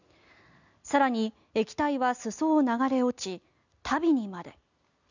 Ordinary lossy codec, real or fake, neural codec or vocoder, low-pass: none; real; none; 7.2 kHz